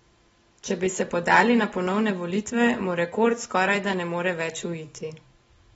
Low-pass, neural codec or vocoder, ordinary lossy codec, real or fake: 10.8 kHz; none; AAC, 24 kbps; real